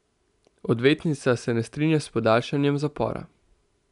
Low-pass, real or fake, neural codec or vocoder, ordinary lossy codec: 10.8 kHz; real; none; none